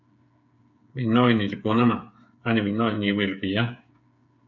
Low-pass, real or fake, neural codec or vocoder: 7.2 kHz; fake; codec, 16 kHz, 8 kbps, FreqCodec, smaller model